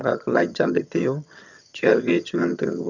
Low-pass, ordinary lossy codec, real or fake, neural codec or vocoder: 7.2 kHz; none; fake; vocoder, 22.05 kHz, 80 mel bands, HiFi-GAN